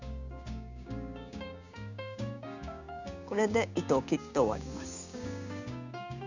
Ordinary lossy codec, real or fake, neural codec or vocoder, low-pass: none; fake; codec, 16 kHz, 6 kbps, DAC; 7.2 kHz